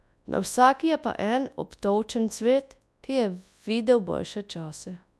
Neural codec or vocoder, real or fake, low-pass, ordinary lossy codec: codec, 24 kHz, 0.9 kbps, WavTokenizer, large speech release; fake; none; none